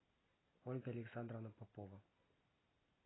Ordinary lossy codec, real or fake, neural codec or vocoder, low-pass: AAC, 16 kbps; real; none; 7.2 kHz